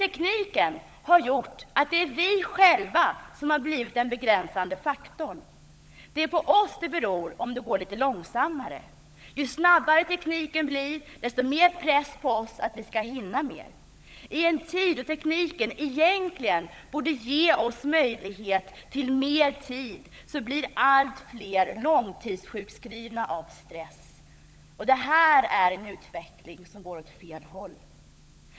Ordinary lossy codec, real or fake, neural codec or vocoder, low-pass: none; fake; codec, 16 kHz, 16 kbps, FunCodec, trained on LibriTTS, 50 frames a second; none